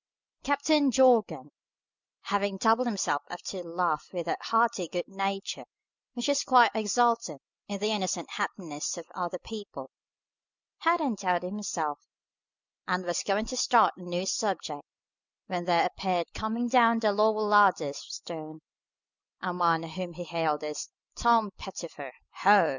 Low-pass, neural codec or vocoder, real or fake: 7.2 kHz; none; real